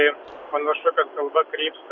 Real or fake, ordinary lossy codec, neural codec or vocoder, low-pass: real; MP3, 48 kbps; none; 7.2 kHz